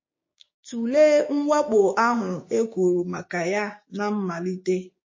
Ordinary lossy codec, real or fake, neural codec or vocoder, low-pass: MP3, 32 kbps; fake; codec, 16 kHz, 6 kbps, DAC; 7.2 kHz